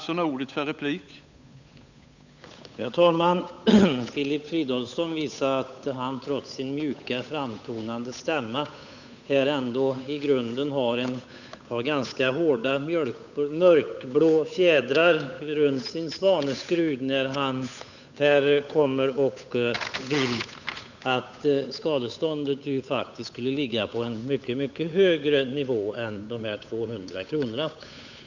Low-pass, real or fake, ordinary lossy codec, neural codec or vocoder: 7.2 kHz; fake; none; codec, 16 kHz, 8 kbps, FunCodec, trained on Chinese and English, 25 frames a second